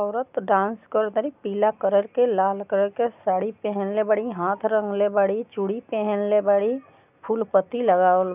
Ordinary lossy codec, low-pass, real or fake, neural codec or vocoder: none; 3.6 kHz; real; none